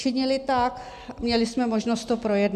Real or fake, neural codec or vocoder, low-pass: real; none; 14.4 kHz